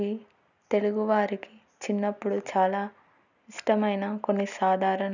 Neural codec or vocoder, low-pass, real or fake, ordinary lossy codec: none; 7.2 kHz; real; none